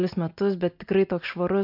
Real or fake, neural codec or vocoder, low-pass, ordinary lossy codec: real; none; 5.4 kHz; MP3, 48 kbps